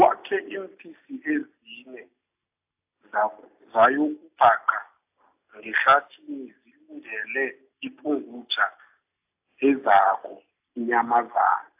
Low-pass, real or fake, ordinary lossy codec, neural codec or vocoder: 3.6 kHz; real; AAC, 32 kbps; none